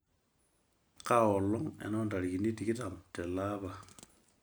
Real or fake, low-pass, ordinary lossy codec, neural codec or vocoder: real; none; none; none